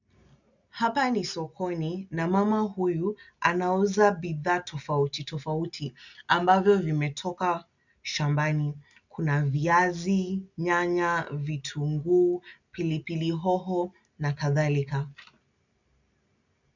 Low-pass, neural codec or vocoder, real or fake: 7.2 kHz; none; real